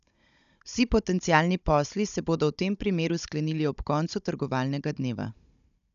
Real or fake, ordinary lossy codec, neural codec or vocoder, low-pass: fake; none; codec, 16 kHz, 16 kbps, FunCodec, trained on Chinese and English, 50 frames a second; 7.2 kHz